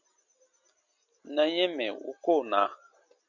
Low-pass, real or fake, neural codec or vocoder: 7.2 kHz; real; none